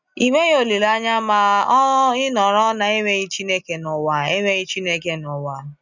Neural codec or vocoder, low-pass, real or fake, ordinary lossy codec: none; 7.2 kHz; real; none